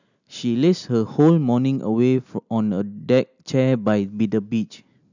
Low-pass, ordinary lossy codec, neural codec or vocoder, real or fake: 7.2 kHz; none; none; real